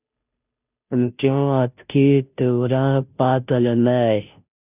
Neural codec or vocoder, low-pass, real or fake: codec, 16 kHz, 0.5 kbps, FunCodec, trained on Chinese and English, 25 frames a second; 3.6 kHz; fake